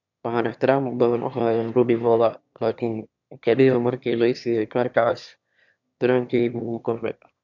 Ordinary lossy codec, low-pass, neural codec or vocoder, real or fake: none; 7.2 kHz; autoencoder, 22.05 kHz, a latent of 192 numbers a frame, VITS, trained on one speaker; fake